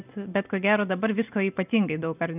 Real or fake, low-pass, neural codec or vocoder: real; 3.6 kHz; none